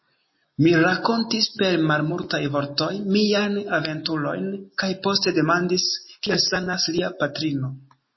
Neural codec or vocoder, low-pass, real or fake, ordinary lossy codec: none; 7.2 kHz; real; MP3, 24 kbps